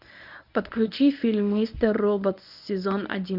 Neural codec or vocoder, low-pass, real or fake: codec, 24 kHz, 0.9 kbps, WavTokenizer, medium speech release version 1; 5.4 kHz; fake